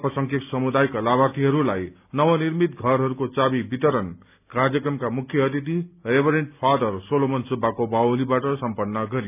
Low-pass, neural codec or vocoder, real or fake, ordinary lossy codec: 3.6 kHz; none; real; none